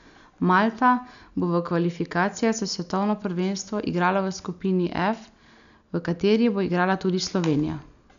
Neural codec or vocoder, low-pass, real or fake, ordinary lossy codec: none; 7.2 kHz; real; none